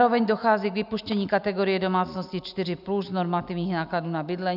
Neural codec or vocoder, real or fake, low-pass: none; real; 5.4 kHz